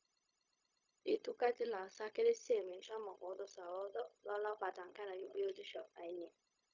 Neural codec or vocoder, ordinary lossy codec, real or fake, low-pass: codec, 16 kHz, 0.4 kbps, LongCat-Audio-Codec; Opus, 64 kbps; fake; 7.2 kHz